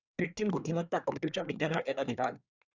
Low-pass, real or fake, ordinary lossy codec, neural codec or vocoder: 7.2 kHz; fake; Opus, 64 kbps; codec, 24 kHz, 1 kbps, SNAC